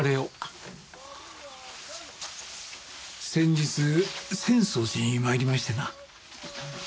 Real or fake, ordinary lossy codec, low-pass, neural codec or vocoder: real; none; none; none